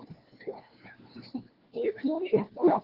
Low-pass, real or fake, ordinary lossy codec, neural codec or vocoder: 5.4 kHz; fake; Opus, 16 kbps; codec, 16 kHz, 1 kbps, FunCodec, trained on LibriTTS, 50 frames a second